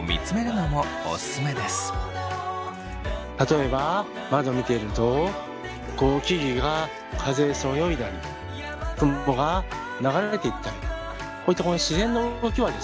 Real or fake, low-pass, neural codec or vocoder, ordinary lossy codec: real; none; none; none